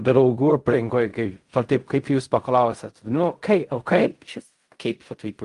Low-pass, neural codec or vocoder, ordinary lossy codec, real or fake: 10.8 kHz; codec, 16 kHz in and 24 kHz out, 0.4 kbps, LongCat-Audio-Codec, fine tuned four codebook decoder; Opus, 32 kbps; fake